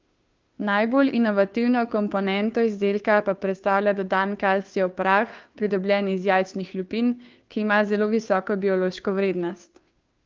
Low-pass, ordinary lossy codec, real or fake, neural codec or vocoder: 7.2 kHz; Opus, 24 kbps; fake; codec, 16 kHz, 2 kbps, FunCodec, trained on Chinese and English, 25 frames a second